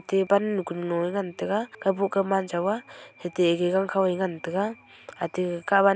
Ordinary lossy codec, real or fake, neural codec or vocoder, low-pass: none; real; none; none